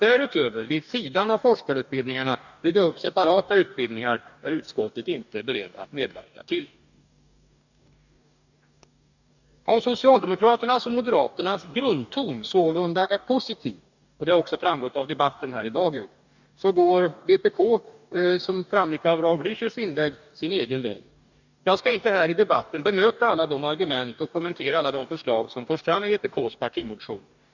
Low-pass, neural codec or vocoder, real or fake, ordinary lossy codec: 7.2 kHz; codec, 44.1 kHz, 2.6 kbps, DAC; fake; none